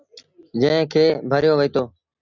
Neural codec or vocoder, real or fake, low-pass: none; real; 7.2 kHz